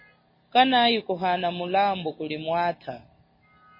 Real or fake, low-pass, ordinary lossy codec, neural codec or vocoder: real; 5.4 kHz; MP3, 24 kbps; none